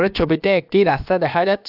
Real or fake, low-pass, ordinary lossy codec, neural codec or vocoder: fake; 5.4 kHz; AAC, 48 kbps; codec, 16 kHz, about 1 kbps, DyCAST, with the encoder's durations